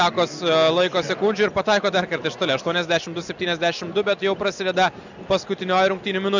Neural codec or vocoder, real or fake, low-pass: none; real; 7.2 kHz